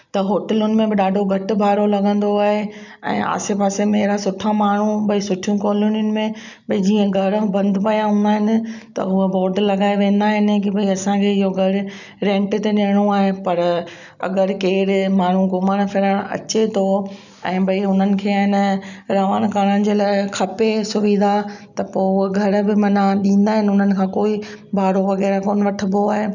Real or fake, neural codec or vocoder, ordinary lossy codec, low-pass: real; none; none; 7.2 kHz